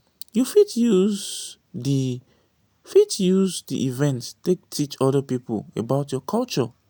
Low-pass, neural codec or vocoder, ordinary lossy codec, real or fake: none; none; none; real